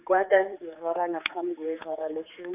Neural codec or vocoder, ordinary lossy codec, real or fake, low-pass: codec, 16 kHz, 4 kbps, X-Codec, HuBERT features, trained on general audio; none; fake; 3.6 kHz